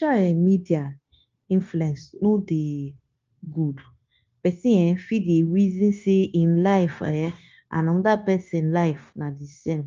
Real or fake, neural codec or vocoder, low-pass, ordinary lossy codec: fake; codec, 16 kHz, 0.9 kbps, LongCat-Audio-Codec; 7.2 kHz; Opus, 24 kbps